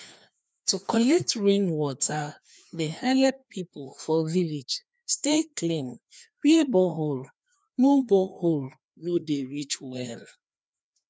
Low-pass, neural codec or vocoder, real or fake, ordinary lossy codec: none; codec, 16 kHz, 2 kbps, FreqCodec, larger model; fake; none